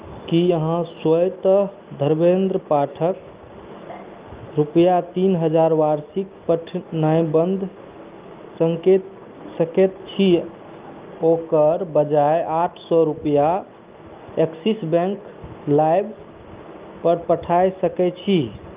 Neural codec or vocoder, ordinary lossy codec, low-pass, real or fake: none; Opus, 32 kbps; 3.6 kHz; real